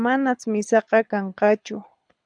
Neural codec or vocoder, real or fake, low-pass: codec, 24 kHz, 6 kbps, HILCodec; fake; 9.9 kHz